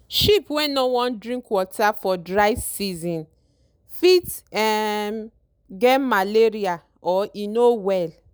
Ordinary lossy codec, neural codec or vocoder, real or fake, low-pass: none; none; real; none